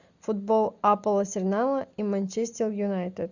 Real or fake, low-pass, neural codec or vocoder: real; 7.2 kHz; none